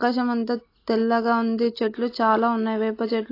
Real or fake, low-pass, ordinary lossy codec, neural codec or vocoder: real; 5.4 kHz; AAC, 32 kbps; none